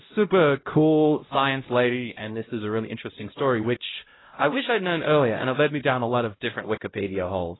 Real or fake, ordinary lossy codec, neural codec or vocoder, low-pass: fake; AAC, 16 kbps; codec, 16 kHz, 0.5 kbps, X-Codec, HuBERT features, trained on LibriSpeech; 7.2 kHz